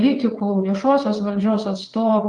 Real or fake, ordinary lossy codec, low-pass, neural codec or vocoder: fake; Opus, 24 kbps; 9.9 kHz; vocoder, 22.05 kHz, 80 mel bands, WaveNeXt